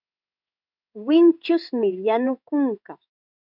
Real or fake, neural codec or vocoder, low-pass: fake; codec, 16 kHz in and 24 kHz out, 1 kbps, XY-Tokenizer; 5.4 kHz